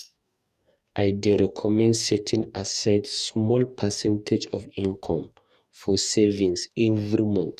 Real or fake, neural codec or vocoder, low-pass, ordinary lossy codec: fake; codec, 44.1 kHz, 2.6 kbps, DAC; 14.4 kHz; none